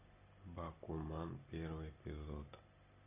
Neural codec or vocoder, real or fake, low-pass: none; real; 3.6 kHz